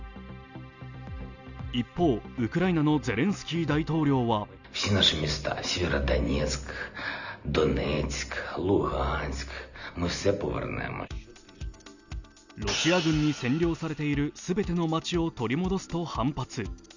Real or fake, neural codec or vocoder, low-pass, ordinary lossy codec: real; none; 7.2 kHz; none